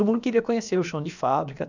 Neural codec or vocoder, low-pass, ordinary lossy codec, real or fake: codec, 16 kHz, about 1 kbps, DyCAST, with the encoder's durations; 7.2 kHz; none; fake